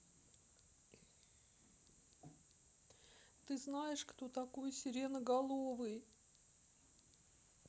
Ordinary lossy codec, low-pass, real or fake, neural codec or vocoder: none; none; real; none